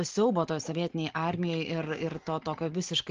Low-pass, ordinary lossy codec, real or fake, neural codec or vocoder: 7.2 kHz; Opus, 16 kbps; real; none